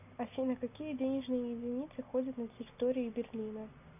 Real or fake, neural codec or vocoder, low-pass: real; none; 3.6 kHz